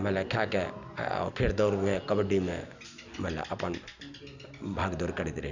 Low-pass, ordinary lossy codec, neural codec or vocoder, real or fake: 7.2 kHz; none; none; real